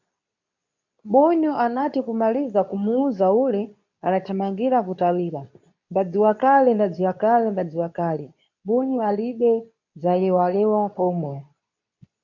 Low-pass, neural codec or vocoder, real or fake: 7.2 kHz; codec, 24 kHz, 0.9 kbps, WavTokenizer, medium speech release version 2; fake